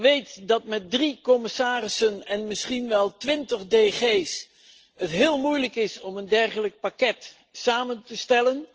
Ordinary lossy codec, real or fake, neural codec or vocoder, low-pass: Opus, 16 kbps; real; none; 7.2 kHz